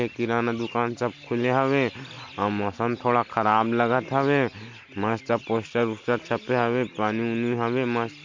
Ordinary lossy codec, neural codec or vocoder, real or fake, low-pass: MP3, 48 kbps; none; real; 7.2 kHz